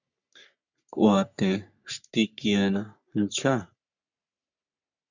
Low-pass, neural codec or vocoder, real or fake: 7.2 kHz; codec, 44.1 kHz, 3.4 kbps, Pupu-Codec; fake